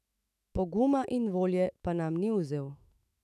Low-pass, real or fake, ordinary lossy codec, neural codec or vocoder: 14.4 kHz; fake; none; autoencoder, 48 kHz, 128 numbers a frame, DAC-VAE, trained on Japanese speech